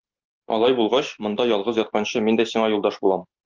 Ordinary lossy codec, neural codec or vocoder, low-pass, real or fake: Opus, 24 kbps; none; 7.2 kHz; real